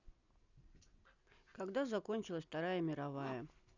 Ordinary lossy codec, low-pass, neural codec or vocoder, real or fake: none; 7.2 kHz; none; real